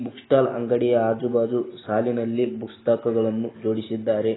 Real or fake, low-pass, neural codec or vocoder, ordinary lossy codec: real; 7.2 kHz; none; AAC, 16 kbps